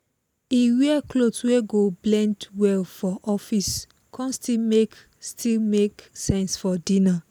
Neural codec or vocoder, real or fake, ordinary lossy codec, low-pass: none; real; none; none